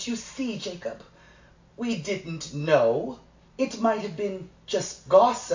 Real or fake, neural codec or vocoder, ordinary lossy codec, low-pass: real; none; AAC, 48 kbps; 7.2 kHz